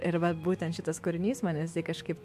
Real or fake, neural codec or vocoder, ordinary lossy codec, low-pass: fake; autoencoder, 48 kHz, 128 numbers a frame, DAC-VAE, trained on Japanese speech; MP3, 64 kbps; 14.4 kHz